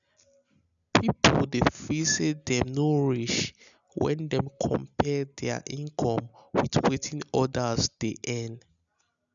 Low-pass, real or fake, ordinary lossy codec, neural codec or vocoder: 7.2 kHz; real; none; none